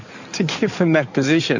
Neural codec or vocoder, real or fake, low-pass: codec, 16 kHz in and 24 kHz out, 2.2 kbps, FireRedTTS-2 codec; fake; 7.2 kHz